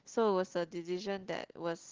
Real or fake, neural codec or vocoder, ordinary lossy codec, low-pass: real; none; Opus, 16 kbps; 7.2 kHz